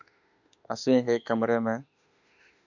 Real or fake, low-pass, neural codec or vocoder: fake; 7.2 kHz; autoencoder, 48 kHz, 32 numbers a frame, DAC-VAE, trained on Japanese speech